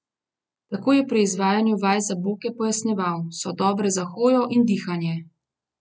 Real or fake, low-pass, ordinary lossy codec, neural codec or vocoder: real; none; none; none